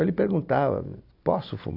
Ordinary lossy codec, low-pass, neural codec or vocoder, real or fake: AAC, 48 kbps; 5.4 kHz; none; real